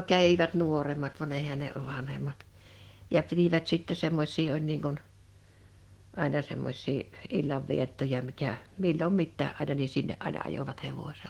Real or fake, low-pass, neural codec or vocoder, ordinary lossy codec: fake; 19.8 kHz; autoencoder, 48 kHz, 128 numbers a frame, DAC-VAE, trained on Japanese speech; Opus, 16 kbps